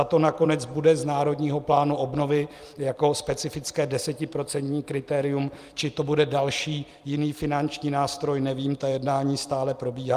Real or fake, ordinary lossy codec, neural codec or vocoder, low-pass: fake; Opus, 32 kbps; vocoder, 44.1 kHz, 128 mel bands every 256 samples, BigVGAN v2; 14.4 kHz